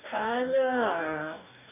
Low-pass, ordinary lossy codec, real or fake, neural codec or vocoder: 3.6 kHz; none; fake; codec, 44.1 kHz, 2.6 kbps, DAC